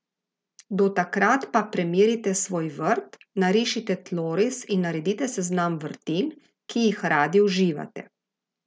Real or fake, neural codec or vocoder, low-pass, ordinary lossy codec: real; none; none; none